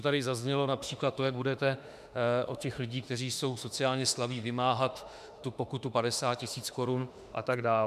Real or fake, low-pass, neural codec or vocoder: fake; 14.4 kHz; autoencoder, 48 kHz, 32 numbers a frame, DAC-VAE, trained on Japanese speech